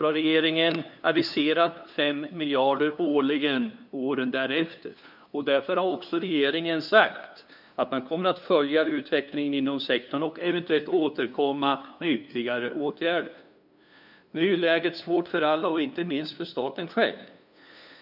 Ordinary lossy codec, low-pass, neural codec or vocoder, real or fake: none; 5.4 kHz; codec, 16 kHz, 2 kbps, FunCodec, trained on LibriTTS, 25 frames a second; fake